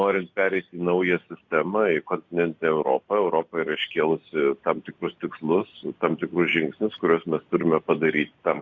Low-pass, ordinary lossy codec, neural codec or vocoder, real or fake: 7.2 kHz; Opus, 64 kbps; none; real